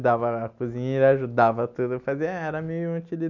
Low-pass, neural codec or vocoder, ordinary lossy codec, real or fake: 7.2 kHz; none; none; real